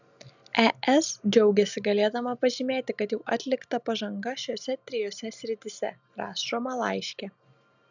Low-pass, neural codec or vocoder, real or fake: 7.2 kHz; none; real